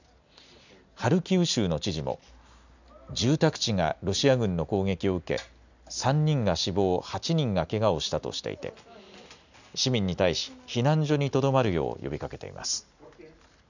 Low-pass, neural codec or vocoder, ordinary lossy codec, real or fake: 7.2 kHz; none; none; real